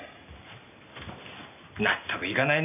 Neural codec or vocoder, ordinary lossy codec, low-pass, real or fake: none; none; 3.6 kHz; real